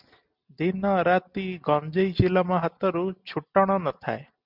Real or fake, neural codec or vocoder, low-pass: real; none; 5.4 kHz